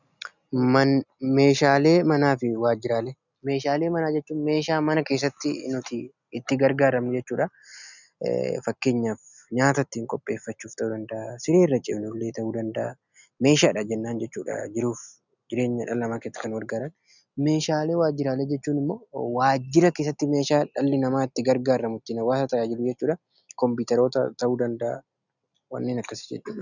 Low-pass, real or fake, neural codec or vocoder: 7.2 kHz; real; none